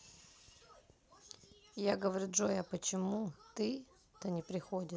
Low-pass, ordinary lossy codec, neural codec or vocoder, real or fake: none; none; none; real